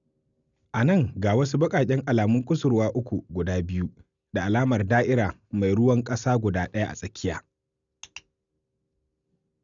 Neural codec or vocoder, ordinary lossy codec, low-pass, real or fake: none; none; 7.2 kHz; real